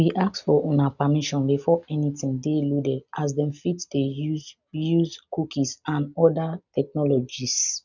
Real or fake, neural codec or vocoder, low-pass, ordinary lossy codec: real; none; 7.2 kHz; none